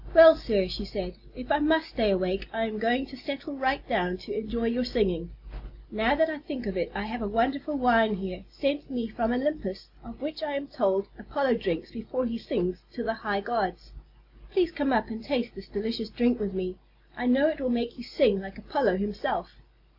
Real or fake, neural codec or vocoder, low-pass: real; none; 5.4 kHz